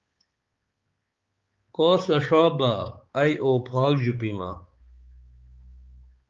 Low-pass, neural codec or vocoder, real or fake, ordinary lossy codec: 7.2 kHz; codec, 16 kHz, 4 kbps, X-Codec, HuBERT features, trained on balanced general audio; fake; Opus, 32 kbps